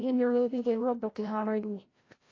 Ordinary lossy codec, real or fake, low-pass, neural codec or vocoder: AAC, 32 kbps; fake; 7.2 kHz; codec, 16 kHz, 0.5 kbps, FreqCodec, larger model